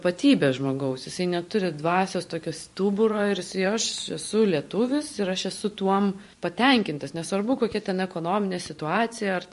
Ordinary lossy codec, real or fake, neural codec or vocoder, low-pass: MP3, 48 kbps; fake; vocoder, 44.1 kHz, 128 mel bands every 512 samples, BigVGAN v2; 14.4 kHz